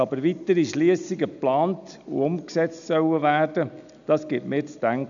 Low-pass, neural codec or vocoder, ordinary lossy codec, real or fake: 7.2 kHz; none; none; real